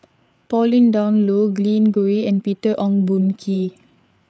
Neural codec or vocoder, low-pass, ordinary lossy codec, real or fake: codec, 16 kHz, 8 kbps, FreqCodec, larger model; none; none; fake